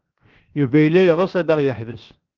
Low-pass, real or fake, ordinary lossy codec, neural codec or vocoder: 7.2 kHz; fake; Opus, 32 kbps; codec, 16 kHz, 0.7 kbps, FocalCodec